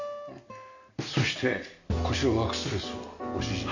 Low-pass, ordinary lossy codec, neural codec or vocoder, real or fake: 7.2 kHz; none; none; real